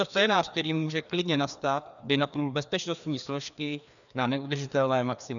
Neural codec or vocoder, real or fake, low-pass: codec, 16 kHz, 2 kbps, FreqCodec, larger model; fake; 7.2 kHz